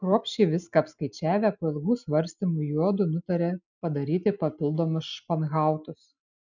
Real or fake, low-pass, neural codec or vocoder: real; 7.2 kHz; none